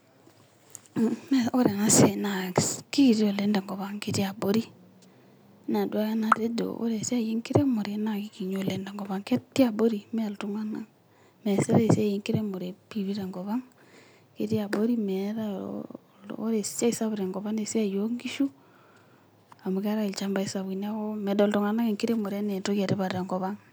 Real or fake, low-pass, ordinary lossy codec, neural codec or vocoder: real; none; none; none